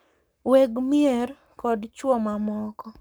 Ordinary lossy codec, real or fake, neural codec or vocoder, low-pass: none; fake; codec, 44.1 kHz, 7.8 kbps, Pupu-Codec; none